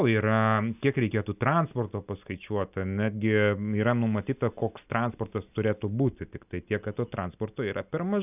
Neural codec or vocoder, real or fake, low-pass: none; real; 3.6 kHz